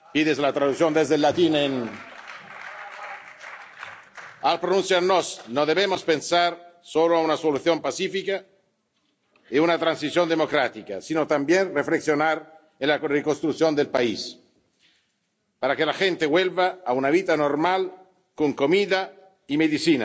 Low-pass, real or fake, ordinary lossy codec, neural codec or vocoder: none; real; none; none